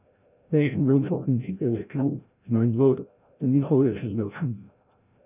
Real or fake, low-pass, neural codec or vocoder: fake; 3.6 kHz; codec, 16 kHz, 0.5 kbps, FreqCodec, larger model